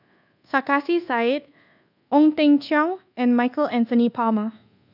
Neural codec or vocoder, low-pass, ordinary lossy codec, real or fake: codec, 24 kHz, 1.2 kbps, DualCodec; 5.4 kHz; none; fake